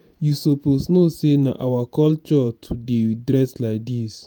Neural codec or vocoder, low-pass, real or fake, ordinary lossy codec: vocoder, 48 kHz, 128 mel bands, Vocos; none; fake; none